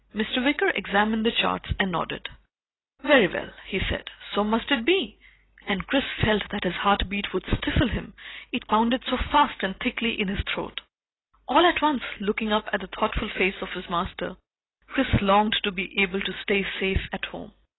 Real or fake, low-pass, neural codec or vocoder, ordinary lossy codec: real; 7.2 kHz; none; AAC, 16 kbps